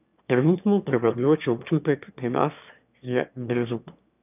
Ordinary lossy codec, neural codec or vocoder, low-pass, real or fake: none; autoencoder, 22.05 kHz, a latent of 192 numbers a frame, VITS, trained on one speaker; 3.6 kHz; fake